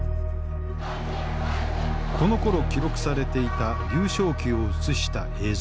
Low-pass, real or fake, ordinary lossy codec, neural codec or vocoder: none; real; none; none